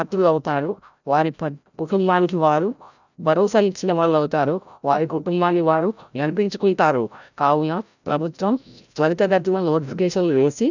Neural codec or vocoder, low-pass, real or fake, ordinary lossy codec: codec, 16 kHz, 0.5 kbps, FreqCodec, larger model; 7.2 kHz; fake; none